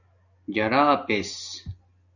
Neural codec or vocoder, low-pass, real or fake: none; 7.2 kHz; real